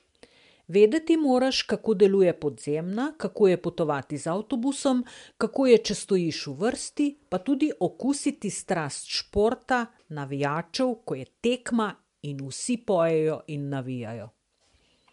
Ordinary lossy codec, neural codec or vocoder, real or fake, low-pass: MP3, 64 kbps; none; real; 10.8 kHz